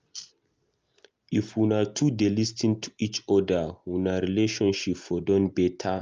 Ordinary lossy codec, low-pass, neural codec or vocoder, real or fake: Opus, 24 kbps; 7.2 kHz; none; real